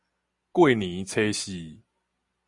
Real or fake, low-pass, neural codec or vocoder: real; 10.8 kHz; none